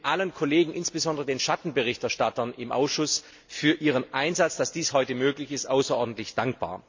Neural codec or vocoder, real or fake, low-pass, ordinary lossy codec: none; real; 7.2 kHz; MP3, 64 kbps